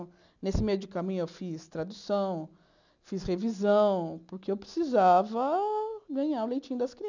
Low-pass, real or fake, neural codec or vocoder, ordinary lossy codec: 7.2 kHz; real; none; none